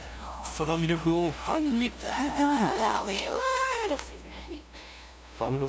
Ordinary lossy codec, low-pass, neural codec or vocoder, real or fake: none; none; codec, 16 kHz, 0.5 kbps, FunCodec, trained on LibriTTS, 25 frames a second; fake